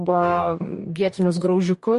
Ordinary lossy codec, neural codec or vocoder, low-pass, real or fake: MP3, 48 kbps; codec, 44.1 kHz, 2.6 kbps, DAC; 14.4 kHz; fake